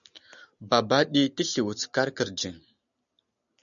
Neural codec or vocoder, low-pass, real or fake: none; 7.2 kHz; real